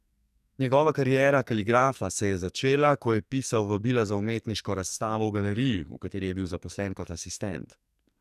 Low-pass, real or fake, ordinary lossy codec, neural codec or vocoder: 14.4 kHz; fake; none; codec, 44.1 kHz, 2.6 kbps, SNAC